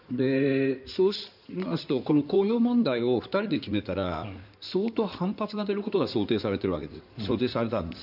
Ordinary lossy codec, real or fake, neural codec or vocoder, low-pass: none; fake; codec, 16 kHz in and 24 kHz out, 2.2 kbps, FireRedTTS-2 codec; 5.4 kHz